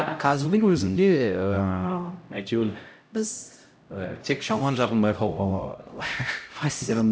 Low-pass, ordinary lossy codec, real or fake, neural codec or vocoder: none; none; fake; codec, 16 kHz, 0.5 kbps, X-Codec, HuBERT features, trained on LibriSpeech